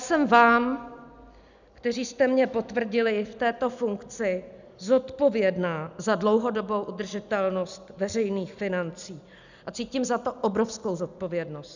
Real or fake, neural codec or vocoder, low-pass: real; none; 7.2 kHz